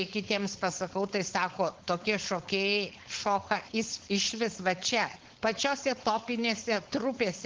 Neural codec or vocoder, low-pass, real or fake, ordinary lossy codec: codec, 16 kHz, 4.8 kbps, FACodec; 7.2 kHz; fake; Opus, 16 kbps